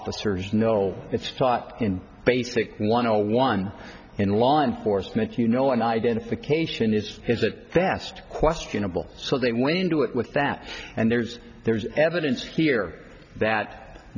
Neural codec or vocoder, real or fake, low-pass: none; real; 7.2 kHz